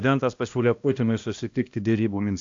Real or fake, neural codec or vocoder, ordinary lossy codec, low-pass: fake; codec, 16 kHz, 1 kbps, X-Codec, HuBERT features, trained on balanced general audio; AAC, 48 kbps; 7.2 kHz